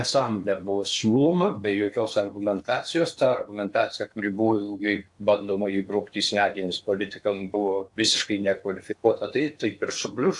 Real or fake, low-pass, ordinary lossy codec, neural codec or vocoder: fake; 10.8 kHz; AAC, 64 kbps; codec, 16 kHz in and 24 kHz out, 0.8 kbps, FocalCodec, streaming, 65536 codes